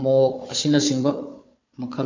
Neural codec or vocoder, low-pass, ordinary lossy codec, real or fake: codec, 16 kHz, 4 kbps, FunCodec, trained on Chinese and English, 50 frames a second; 7.2 kHz; MP3, 48 kbps; fake